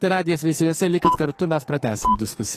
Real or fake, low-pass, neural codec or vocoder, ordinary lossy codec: fake; 14.4 kHz; codec, 44.1 kHz, 2.6 kbps, SNAC; AAC, 48 kbps